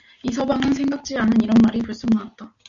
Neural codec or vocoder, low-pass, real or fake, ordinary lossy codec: none; 7.2 kHz; real; MP3, 64 kbps